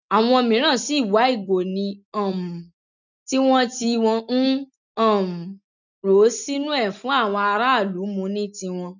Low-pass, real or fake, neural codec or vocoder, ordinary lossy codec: 7.2 kHz; real; none; none